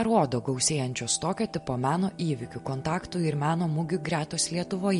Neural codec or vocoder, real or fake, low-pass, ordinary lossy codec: none; real; 14.4 kHz; MP3, 48 kbps